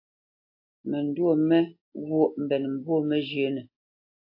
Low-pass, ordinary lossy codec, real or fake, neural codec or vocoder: 5.4 kHz; AAC, 32 kbps; real; none